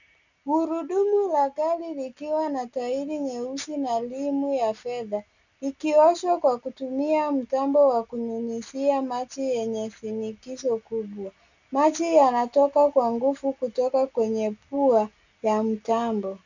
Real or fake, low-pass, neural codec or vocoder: real; 7.2 kHz; none